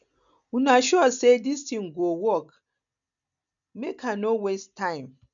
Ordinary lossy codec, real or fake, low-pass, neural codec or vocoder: none; real; 7.2 kHz; none